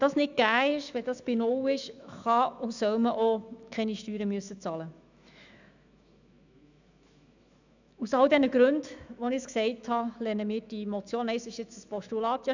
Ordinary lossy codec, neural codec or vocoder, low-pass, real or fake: none; autoencoder, 48 kHz, 128 numbers a frame, DAC-VAE, trained on Japanese speech; 7.2 kHz; fake